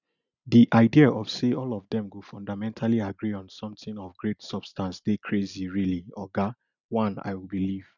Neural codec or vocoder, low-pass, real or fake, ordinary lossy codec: none; 7.2 kHz; real; none